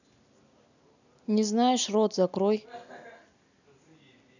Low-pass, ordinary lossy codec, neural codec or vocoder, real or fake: 7.2 kHz; none; none; real